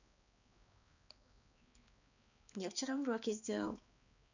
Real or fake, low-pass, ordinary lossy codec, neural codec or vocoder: fake; 7.2 kHz; none; codec, 16 kHz, 2 kbps, X-Codec, HuBERT features, trained on balanced general audio